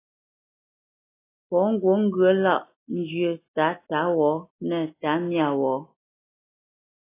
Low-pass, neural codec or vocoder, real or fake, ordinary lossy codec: 3.6 kHz; none; real; AAC, 24 kbps